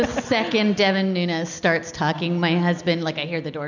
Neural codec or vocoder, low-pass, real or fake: none; 7.2 kHz; real